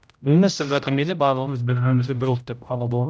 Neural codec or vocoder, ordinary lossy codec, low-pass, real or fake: codec, 16 kHz, 0.5 kbps, X-Codec, HuBERT features, trained on general audio; none; none; fake